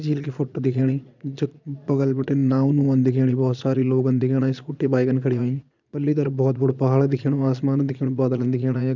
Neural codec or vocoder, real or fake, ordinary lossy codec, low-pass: vocoder, 22.05 kHz, 80 mel bands, Vocos; fake; none; 7.2 kHz